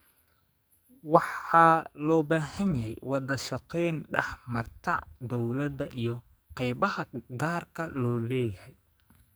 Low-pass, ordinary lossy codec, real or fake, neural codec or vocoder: none; none; fake; codec, 44.1 kHz, 2.6 kbps, SNAC